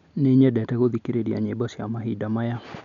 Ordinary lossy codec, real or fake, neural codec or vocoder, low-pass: MP3, 96 kbps; real; none; 7.2 kHz